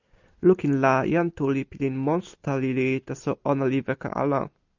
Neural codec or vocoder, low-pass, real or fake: none; 7.2 kHz; real